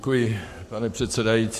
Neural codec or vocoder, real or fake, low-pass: codec, 44.1 kHz, 7.8 kbps, Pupu-Codec; fake; 14.4 kHz